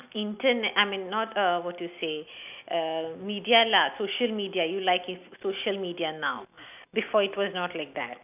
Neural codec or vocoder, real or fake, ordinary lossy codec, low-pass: none; real; none; 3.6 kHz